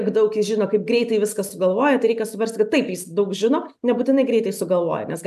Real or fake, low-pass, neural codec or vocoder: real; 14.4 kHz; none